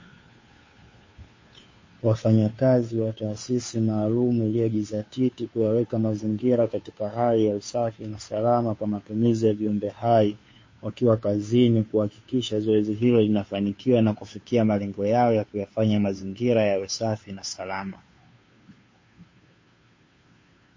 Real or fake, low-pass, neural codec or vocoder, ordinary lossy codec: fake; 7.2 kHz; codec, 16 kHz, 2 kbps, FunCodec, trained on Chinese and English, 25 frames a second; MP3, 32 kbps